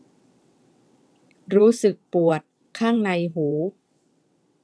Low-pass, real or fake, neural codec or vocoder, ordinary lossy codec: none; fake; vocoder, 22.05 kHz, 80 mel bands, WaveNeXt; none